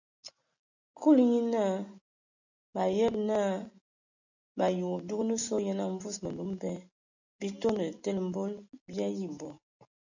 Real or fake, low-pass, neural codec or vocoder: real; 7.2 kHz; none